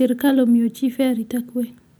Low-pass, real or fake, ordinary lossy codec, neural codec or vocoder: none; real; none; none